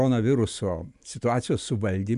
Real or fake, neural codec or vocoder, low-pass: real; none; 10.8 kHz